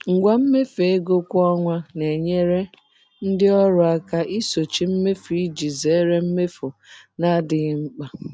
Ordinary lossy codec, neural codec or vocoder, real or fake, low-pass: none; none; real; none